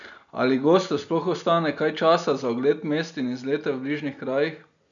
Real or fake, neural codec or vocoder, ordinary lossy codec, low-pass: real; none; none; 7.2 kHz